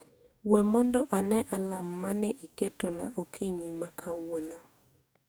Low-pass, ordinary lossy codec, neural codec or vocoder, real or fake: none; none; codec, 44.1 kHz, 2.6 kbps, DAC; fake